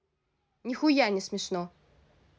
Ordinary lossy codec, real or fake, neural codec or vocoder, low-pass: none; real; none; none